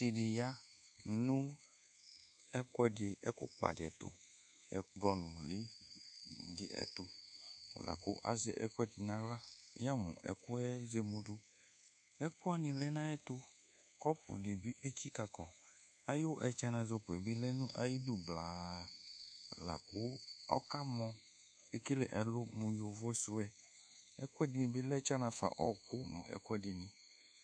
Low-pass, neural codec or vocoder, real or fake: 9.9 kHz; codec, 24 kHz, 1.2 kbps, DualCodec; fake